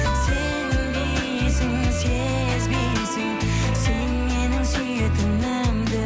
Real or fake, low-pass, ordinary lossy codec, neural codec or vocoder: real; none; none; none